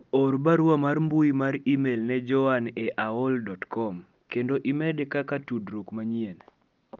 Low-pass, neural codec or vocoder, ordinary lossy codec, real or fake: 7.2 kHz; none; Opus, 32 kbps; real